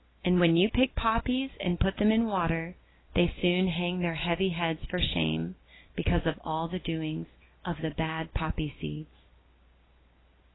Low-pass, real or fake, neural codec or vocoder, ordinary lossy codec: 7.2 kHz; real; none; AAC, 16 kbps